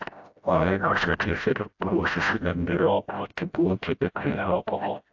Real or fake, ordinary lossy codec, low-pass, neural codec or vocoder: fake; AAC, 48 kbps; 7.2 kHz; codec, 16 kHz, 0.5 kbps, FreqCodec, smaller model